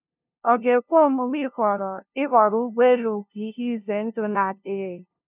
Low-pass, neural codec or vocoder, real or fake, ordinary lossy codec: 3.6 kHz; codec, 16 kHz, 0.5 kbps, FunCodec, trained on LibriTTS, 25 frames a second; fake; none